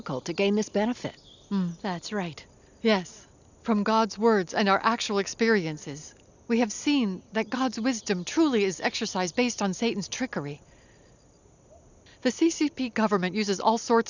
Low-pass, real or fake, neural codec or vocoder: 7.2 kHz; real; none